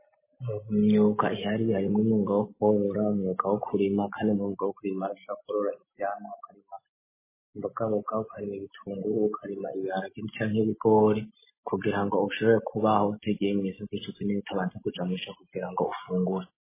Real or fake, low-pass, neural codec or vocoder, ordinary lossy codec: real; 3.6 kHz; none; MP3, 16 kbps